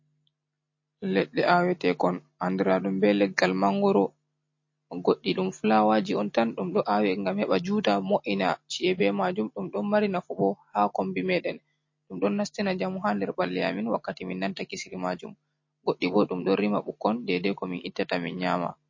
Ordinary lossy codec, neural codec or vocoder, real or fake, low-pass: MP3, 32 kbps; none; real; 7.2 kHz